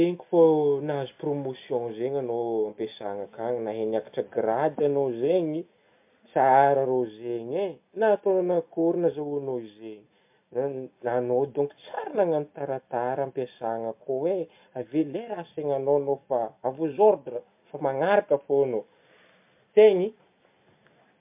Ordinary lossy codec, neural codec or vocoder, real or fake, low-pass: MP3, 32 kbps; none; real; 3.6 kHz